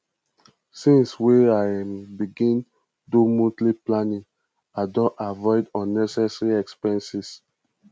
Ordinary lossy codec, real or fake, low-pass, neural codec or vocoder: none; real; none; none